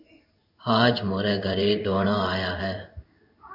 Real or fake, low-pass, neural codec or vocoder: fake; 5.4 kHz; codec, 16 kHz in and 24 kHz out, 1 kbps, XY-Tokenizer